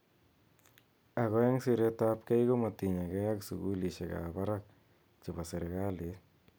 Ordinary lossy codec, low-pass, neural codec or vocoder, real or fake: none; none; none; real